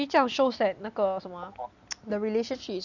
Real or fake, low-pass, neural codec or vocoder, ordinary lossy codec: real; 7.2 kHz; none; none